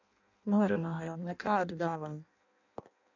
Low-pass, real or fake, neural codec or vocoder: 7.2 kHz; fake; codec, 16 kHz in and 24 kHz out, 0.6 kbps, FireRedTTS-2 codec